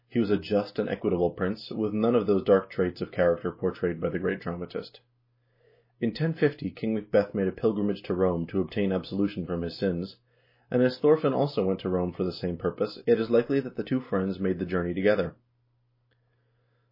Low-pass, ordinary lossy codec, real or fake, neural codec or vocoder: 5.4 kHz; MP3, 24 kbps; real; none